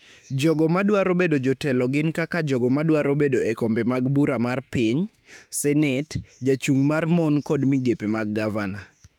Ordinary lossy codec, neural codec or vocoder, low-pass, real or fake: none; autoencoder, 48 kHz, 32 numbers a frame, DAC-VAE, trained on Japanese speech; 19.8 kHz; fake